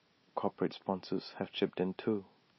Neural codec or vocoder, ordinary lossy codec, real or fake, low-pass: none; MP3, 24 kbps; real; 7.2 kHz